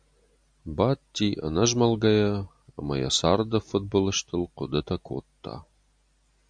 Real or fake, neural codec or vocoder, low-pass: real; none; 9.9 kHz